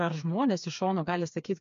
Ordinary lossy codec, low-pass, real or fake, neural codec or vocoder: MP3, 48 kbps; 7.2 kHz; fake; codec, 16 kHz, 4 kbps, FreqCodec, smaller model